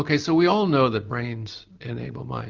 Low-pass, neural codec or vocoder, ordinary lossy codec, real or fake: 7.2 kHz; none; Opus, 24 kbps; real